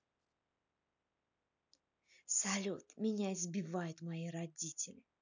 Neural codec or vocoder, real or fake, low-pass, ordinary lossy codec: none; real; 7.2 kHz; none